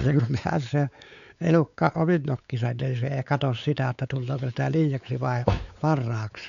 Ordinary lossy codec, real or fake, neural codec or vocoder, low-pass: none; fake; codec, 16 kHz, 4 kbps, X-Codec, WavLM features, trained on Multilingual LibriSpeech; 7.2 kHz